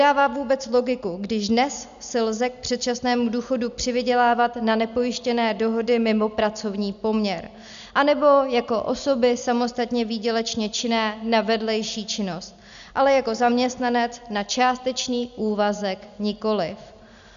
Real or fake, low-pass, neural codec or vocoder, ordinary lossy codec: real; 7.2 kHz; none; MP3, 96 kbps